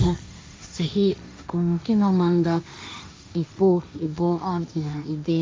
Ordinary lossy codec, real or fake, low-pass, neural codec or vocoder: none; fake; none; codec, 16 kHz, 1.1 kbps, Voila-Tokenizer